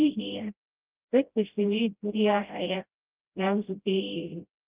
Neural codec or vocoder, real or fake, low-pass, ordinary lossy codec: codec, 16 kHz, 0.5 kbps, FreqCodec, smaller model; fake; 3.6 kHz; Opus, 32 kbps